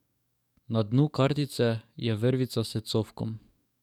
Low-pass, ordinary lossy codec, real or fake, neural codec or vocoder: 19.8 kHz; none; fake; codec, 44.1 kHz, 7.8 kbps, DAC